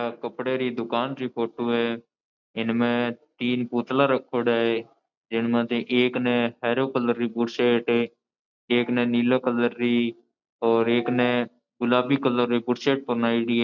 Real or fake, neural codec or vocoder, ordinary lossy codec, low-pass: real; none; none; 7.2 kHz